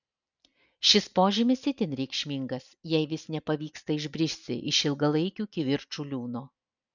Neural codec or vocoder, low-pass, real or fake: none; 7.2 kHz; real